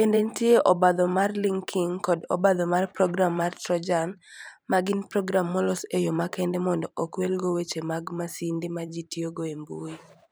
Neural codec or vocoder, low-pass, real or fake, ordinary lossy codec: vocoder, 44.1 kHz, 128 mel bands every 512 samples, BigVGAN v2; none; fake; none